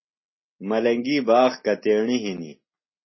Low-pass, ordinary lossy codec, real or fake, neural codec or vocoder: 7.2 kHz; MP3, 24 kbps; real; none